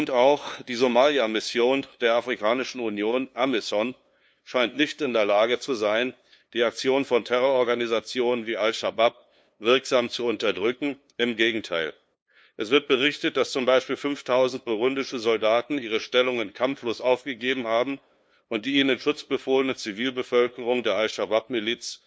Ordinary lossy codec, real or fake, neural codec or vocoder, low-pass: none; fake; codec, 16 kHz, 2 kbps, FunCodec, trained on LibriTTS, 25 frames a second; none